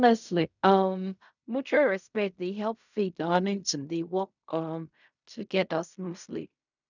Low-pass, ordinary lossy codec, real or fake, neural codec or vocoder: 7.2 kHz; none; fake; codec, 16 kHz in and 24 kHz out, 0.4 kbps, LongCat-Audio-Codec, fine tuned four codebook decoder